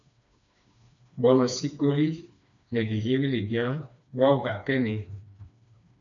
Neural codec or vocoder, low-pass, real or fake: codec, 16 kHz, 2 kbps, FreqCodec, smaller model; 7.2 kHz; fake